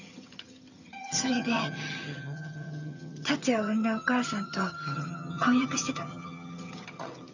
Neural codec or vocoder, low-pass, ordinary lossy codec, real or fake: vocoder, 22.05 kHz, 80 mel bands, HiFi-GAN; 7.2 kHz; none; fake